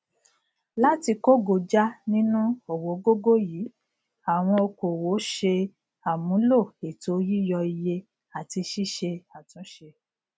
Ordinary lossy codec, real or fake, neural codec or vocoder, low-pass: none; real; none; none